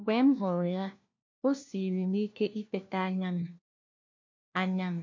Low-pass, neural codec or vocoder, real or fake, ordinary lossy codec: 7.2 kHz; codec, 16 kHz, 1 kbps, FunCodec, trained on LibriTTS, 50 frames a second; fake; MP3, 48 kbps